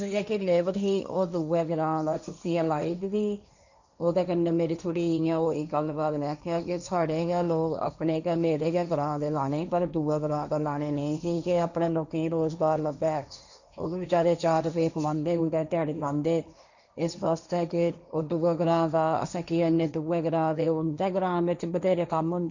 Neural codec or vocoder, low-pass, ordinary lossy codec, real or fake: codec, 16 kHz, 1.1 kbps, Voila-Tokenizer; 7.2 kHz; none; fake